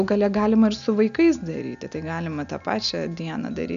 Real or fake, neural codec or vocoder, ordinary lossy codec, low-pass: real; none; AAC, 96 kbps; 7.2 kHz